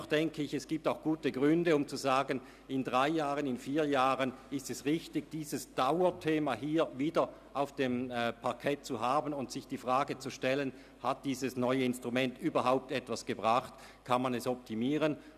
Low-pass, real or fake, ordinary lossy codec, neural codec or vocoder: 14.4 kHz; real; none; none